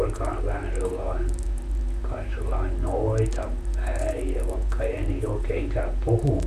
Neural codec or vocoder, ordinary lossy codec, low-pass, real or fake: vocoder, 44.1 kHz, 128 mel bands, Pupu-Vocoder; none; 14.4 kHz; fake